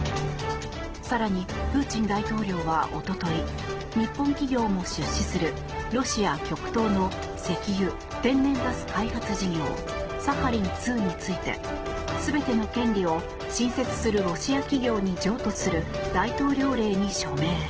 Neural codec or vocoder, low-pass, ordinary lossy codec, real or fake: none; 7.2 kHz; Opus, 16 kbps; real